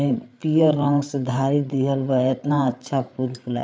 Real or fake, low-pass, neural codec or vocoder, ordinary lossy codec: fake; none; codec, 16 kHz, 8 kbps, FreqCodec, larger model; none